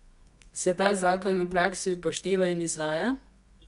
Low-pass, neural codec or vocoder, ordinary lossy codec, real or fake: 10.8 kHz; codec, 24 kHz, 0.9 kbps, WavTokenizer, medium music audio release; none; fake